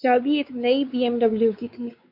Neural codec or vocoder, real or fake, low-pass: codec, 16 kHz, 4 kbps, X-Codec, WavLM features, trained on Multilingual LibriSpeech; fake; 5.4 kHz